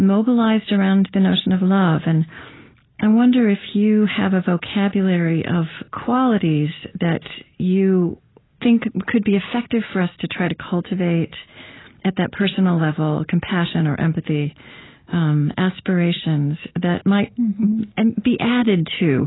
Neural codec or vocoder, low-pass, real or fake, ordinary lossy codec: none; 7.2 kHz; real; AAC, 16 kbps